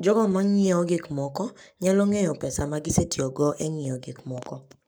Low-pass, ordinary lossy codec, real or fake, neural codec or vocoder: none; none; fake; codec, 44.1 kHz, 7.8 kbps, Pupu-Codec